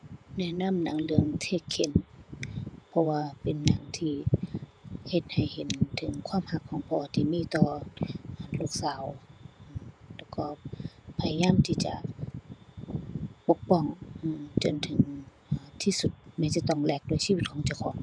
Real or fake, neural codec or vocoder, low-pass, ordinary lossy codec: fake; vocoder, 48 kHz, 128 mel bands, Vocos; 9.9 kHz; none